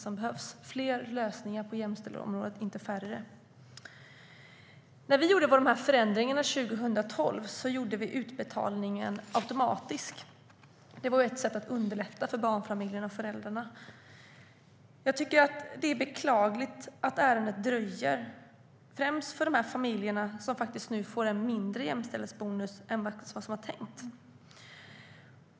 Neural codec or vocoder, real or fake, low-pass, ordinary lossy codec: none; real; none; none